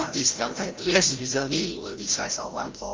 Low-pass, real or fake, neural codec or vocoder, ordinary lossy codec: 7.2 kHz; fake; codec, 16 kHz, 0.5 kbps, FreqCodec, larger model; Opus, 32 kbps